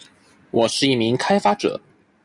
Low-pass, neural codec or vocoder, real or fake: 10.8 kHz; none; real